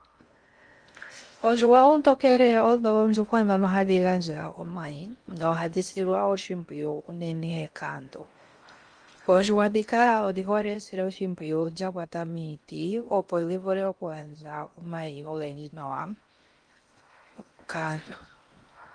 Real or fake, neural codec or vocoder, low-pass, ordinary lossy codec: fake; codec, 16 kHz in and 24 kHz out, 0.6 kbps, FocalCodec, streaming, 2048 codes; 9.9 kHz; Opus, 32 kbps